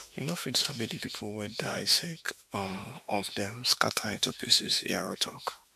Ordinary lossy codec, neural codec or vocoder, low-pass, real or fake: MP3, 96 kbps; autoencoder, 48 kHz, 32 numbers a frame, DAC-VAE, trained on Japanese speech; 14.4 kHz; fake